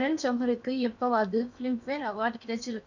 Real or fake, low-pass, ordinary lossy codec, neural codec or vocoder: fake; 7.2 kHz; none; codec, 16 kHz in and 24 kHz out, 0.8 kbps, FocalCodec, streaming, 65536 codes